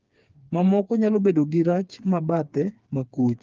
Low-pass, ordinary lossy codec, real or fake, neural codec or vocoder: 7.2 kHz; Opus, 24 kbps; fake; codec, 16 kHz, 4 kbps, FreqCodec, smaller model